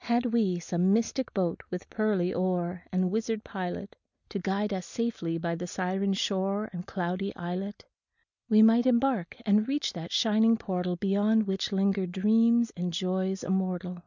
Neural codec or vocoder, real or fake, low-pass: none; real; 7.2 kHz